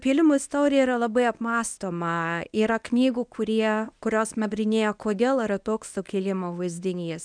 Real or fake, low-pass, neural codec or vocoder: fake; 9.9 kHz; codec, 24 kHz, 0.9 kbps, WavTokenizer, medium speech release version 1